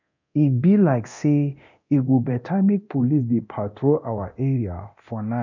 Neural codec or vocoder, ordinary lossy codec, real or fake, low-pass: codec, 24 kHz, 0.9 kbps, DualCodec; none; fake; 7.2 kHz